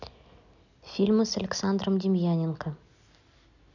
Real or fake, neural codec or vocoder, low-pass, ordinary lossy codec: real; none; 7.2 kHz; none